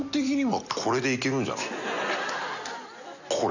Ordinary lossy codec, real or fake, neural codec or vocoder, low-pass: none; real; none; 7.2 kHz